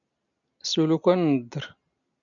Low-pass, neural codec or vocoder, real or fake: 7.2 kHz; none; real